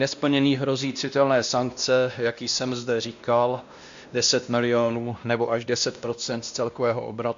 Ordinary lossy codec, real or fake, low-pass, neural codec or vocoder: MP3, 64 kbps; fake; 7.2 kHz; codec, 16 kHz, 1 kbps, X-Codec, WavLM features, trained on Multilingual LibriSpeech